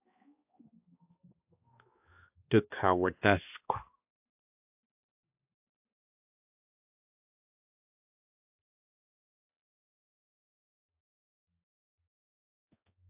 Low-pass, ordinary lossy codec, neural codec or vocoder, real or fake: 3.6 kHz; AAC, 32 kbps; codec, 16 kHz, 2 kbps, X-Codec, HuBERT features, trained on balanced general audio; fake